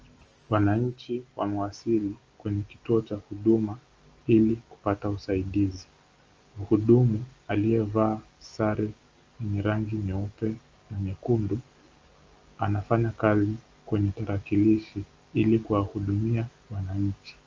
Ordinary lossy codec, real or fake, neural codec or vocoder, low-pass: Opus, 24 kbps; real; none; 7.2 kHz